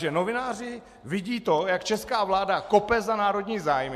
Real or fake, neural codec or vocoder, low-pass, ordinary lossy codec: real; none; 14.4 kHz; MP3, 64 kbps